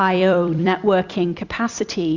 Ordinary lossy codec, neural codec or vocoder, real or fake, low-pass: Opus, 64 kbps; vocoder, 44.1 kHz, 128 mel bands every 256 samples, BigVGAN v2; fake; 7.2 kHz